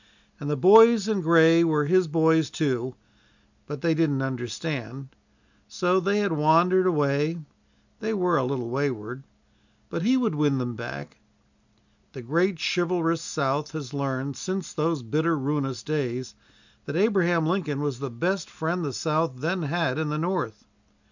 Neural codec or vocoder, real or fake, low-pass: none; real; 7.2 kHz